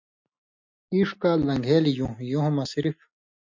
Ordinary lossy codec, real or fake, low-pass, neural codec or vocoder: MP3, 48 kbps; real; 7.2 kHz; none